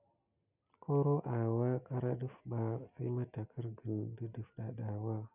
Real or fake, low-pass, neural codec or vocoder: real; 3.6 kHz; none